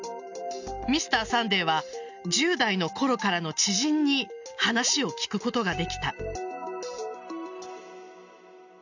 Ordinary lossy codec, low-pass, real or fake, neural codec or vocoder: none; 7.2 kHz; real; none